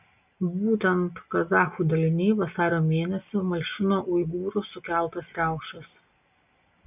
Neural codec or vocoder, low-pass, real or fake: none; 3.6 kHz; real